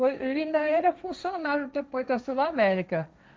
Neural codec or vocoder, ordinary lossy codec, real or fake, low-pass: codec, 16 kHz, 1.1 kbps, Voila-Tokenizer; none; fake; none